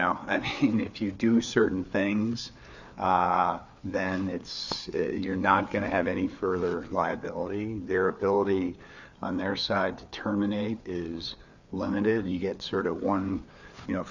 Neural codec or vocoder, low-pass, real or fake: codec, 16 kHz, 4 kbps, FreqCodec, larger model; 7.2 kHz; fake